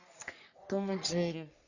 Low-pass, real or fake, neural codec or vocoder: 7.2 kHz; fake; codec, 44.1 kHz, 3.4 kbps, Pupu-Codec